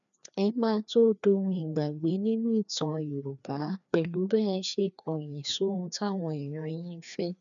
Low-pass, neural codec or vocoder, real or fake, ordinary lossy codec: 7.2 kHz; codec, 16 kHz, 2 kbps, FreqCodec, larger model; fake; MP3, 96 kbps